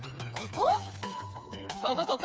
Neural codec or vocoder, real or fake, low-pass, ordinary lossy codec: codec, 16 kHz, 4 kbps, FreqCodec, smaller model; fake; none; none